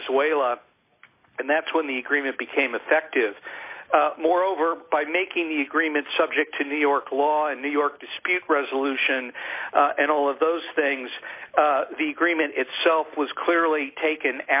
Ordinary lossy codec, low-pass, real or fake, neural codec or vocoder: AAC, 32 kbps; 3.6 kHz; real; none